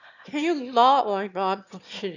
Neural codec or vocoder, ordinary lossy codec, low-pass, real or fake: autoencoder, 22.05 kHz, a latent of 192 numbers a frame, VITS, trained on one speaker; none; 7.2 kHz; fake